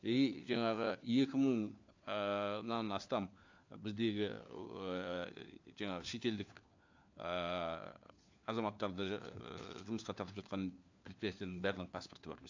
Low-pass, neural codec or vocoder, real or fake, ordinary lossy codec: 7.2 kHz; codec, 16 kHz, 4 kbps, FunCodec, trained on LibriTTS, 50 frames a second; fake; AAC, 48 kbps